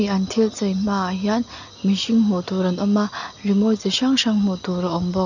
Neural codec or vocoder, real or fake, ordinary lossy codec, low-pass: none; real; none; 7.2 kHz